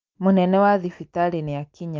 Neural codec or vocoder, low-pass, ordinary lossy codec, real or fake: none; 7.2 kHz; Opus, 24 kbps; real